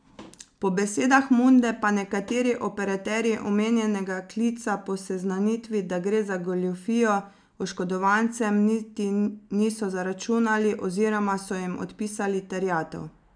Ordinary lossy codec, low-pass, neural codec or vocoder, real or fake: none; 9.9 kHz; none; real